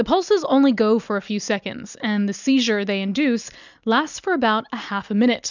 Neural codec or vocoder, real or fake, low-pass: none; real; 7.2 kHz